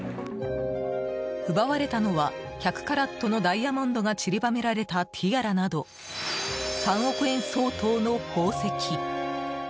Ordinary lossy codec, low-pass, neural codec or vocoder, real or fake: none; none; none; real